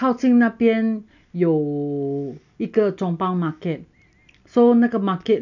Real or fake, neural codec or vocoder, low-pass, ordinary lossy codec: real; none; 7.2 kHz; none